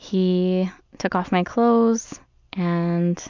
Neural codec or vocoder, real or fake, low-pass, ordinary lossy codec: none; real; 7.2 kHz; MP3, 64 kbps